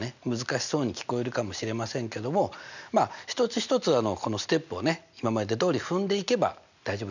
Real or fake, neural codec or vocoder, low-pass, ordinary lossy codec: real; none; 7.2 kHz; none